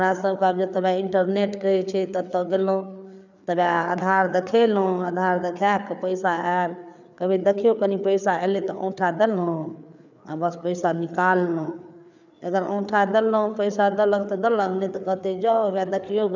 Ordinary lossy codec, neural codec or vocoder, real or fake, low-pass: none; codec, 16 kHz, 4 kbps, FreqCodec, larger model; fake; 7.2 kHz